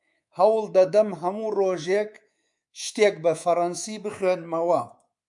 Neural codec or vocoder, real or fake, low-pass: codec, 24 kHz, 3.1 kbps, DualCodec; fake; 10.8 kHz